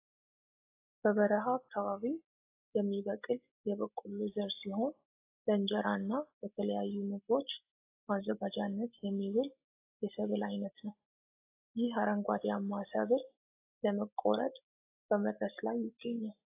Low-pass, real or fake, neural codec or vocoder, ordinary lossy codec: 3.6 kHz; real; none; AAC, 24 kbps